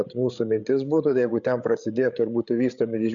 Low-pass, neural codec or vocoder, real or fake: 7.2 kHz; codec, 16 kHz, 8 kbps, FreqCodec, larger model; fake